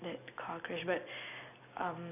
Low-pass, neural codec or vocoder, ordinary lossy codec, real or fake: 3.6 kHz; none; none; real